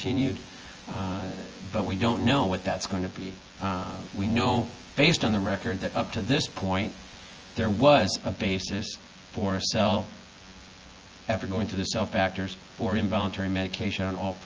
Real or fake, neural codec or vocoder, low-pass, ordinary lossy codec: fake; vocoder, 24 kHz, 100 mel bands, Vocos; 7.2 kHz; Opus, 24 kbps